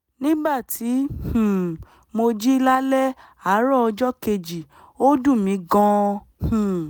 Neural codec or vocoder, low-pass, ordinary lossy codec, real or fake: none; none; none; real